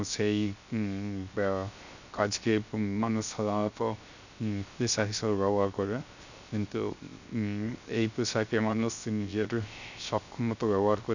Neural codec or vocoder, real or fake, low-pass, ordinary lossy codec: codec, 16 kHz, 0.3 kbps, FocalCodec; fake; 7.2 kHz; none